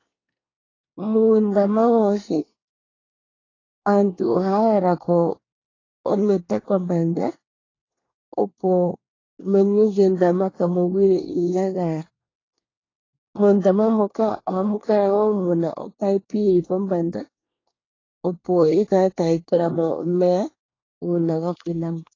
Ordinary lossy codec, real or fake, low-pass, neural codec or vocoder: AAC, 32 kbps; fake; 7.2 kHz; codec, 24 kHz, 1 kbps, SNAC